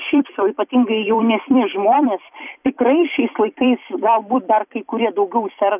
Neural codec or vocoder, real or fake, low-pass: vocoder, 44.1 kHz, 128 mel bands every 256 samples, BigVGAN v2; fake; 3.6 kHz